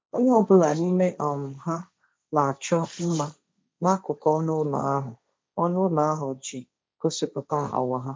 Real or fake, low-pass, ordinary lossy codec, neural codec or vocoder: fake; none; none; codec, 16 kHz, 1.1 kbps, Voila-Tokenizer